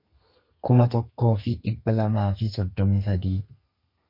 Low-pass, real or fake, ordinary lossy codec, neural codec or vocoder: 5.4 kHz; fake; MP3, 32 kbps; codec, 32 kHz, 1.9 kbps, SNAC